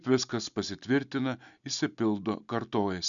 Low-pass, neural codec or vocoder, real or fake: 7.2 kHz; none; real